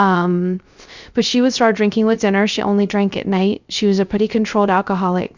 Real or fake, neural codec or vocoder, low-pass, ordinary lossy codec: fake; codec, 16 kHz, 0.3 kbps, FocalCodec; 7.2 kHz; Opus, 64 kbps